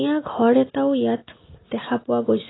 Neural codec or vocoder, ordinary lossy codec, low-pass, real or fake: none; AAC, 16 kbps; 7.2 kHz; real